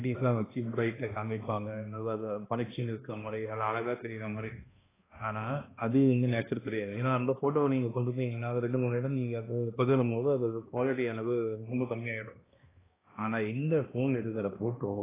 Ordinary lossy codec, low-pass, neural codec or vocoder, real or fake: AAC, 16 kbps; 3.6 kHz; codec, 16 kHz, 1 kbps, X-Codec, HuBERT features, trained on balanced general audio; fake